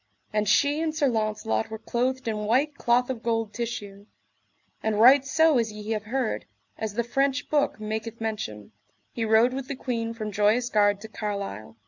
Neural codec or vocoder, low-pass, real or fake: none; 7.2 kHz; real